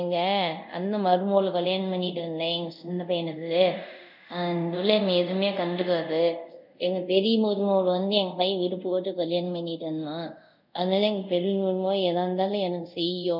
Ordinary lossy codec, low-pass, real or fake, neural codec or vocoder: none; 5.4 kHz; fake; codec, 24 kHz, 0.5 kbps, DualCodec